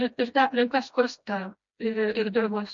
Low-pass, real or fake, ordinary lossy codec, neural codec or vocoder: 7.2 kHz; fake; MP3, 48 kbps; codec, 16 kHz, 1 kbps, FreqCodec, smaller model